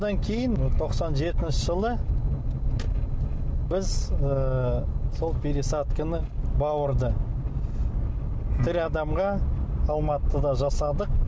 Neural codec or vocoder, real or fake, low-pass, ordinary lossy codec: none; real; none; none